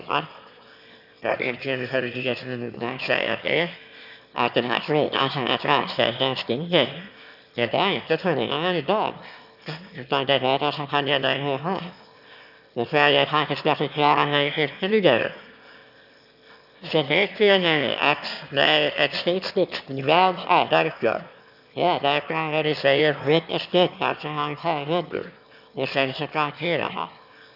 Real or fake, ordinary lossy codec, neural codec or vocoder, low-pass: fake; none; autoencoder, 22.05 kHz, a latent of 192 numbers a frame, VITS, trained on one speaker; 5.4 kHz